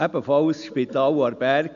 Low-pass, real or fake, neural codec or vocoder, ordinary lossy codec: 7.2 kHz; real; none; none